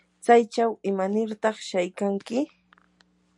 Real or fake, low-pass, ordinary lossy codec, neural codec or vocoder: real; 10.8 kHz; MP3, 96 kbps; none